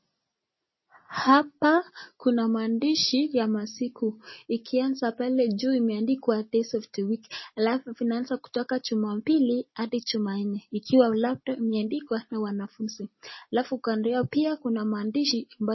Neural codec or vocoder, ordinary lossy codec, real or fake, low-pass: none; MP3, 24 kbps; real; 7.2 kHz